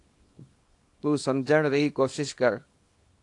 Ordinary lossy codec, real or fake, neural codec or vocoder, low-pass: AAC, 48 kbps; fake; codec, 24 kHz, 0.9 kbps, WavTokenizer, small release; 10.8 kHz